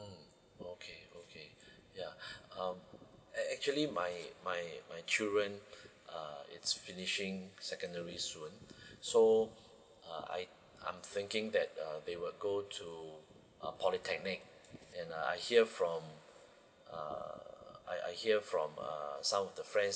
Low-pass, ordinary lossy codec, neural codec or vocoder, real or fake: none; none; none; real